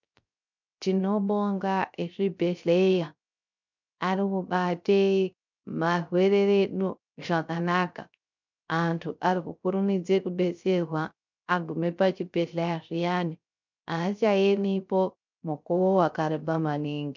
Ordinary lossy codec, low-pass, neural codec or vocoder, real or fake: MP3, 64 kbps; 7.2 kHz; codec, 16 kHz, 0.3 kbps, FocalCodec; fake